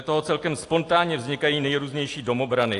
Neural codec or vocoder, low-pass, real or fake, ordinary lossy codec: none; 10.8 kHz; real; AAC, 48 kbps